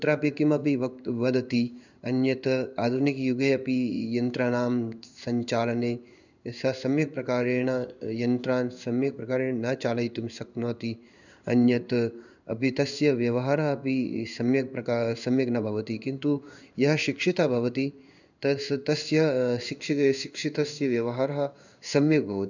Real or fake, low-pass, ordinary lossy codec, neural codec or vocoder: fake; 7.2 kHz; none; codec, 16 kHz in and 24 kHz out, 1 kbps, XY-Tokenizer